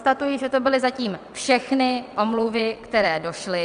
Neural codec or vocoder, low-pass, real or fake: vocoder, 22.05 kHz, 80 mel bands, WaveNeXt; 9.9 kHz; fake